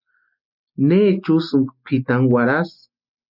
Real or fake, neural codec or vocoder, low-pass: real; none; 5.4 kHz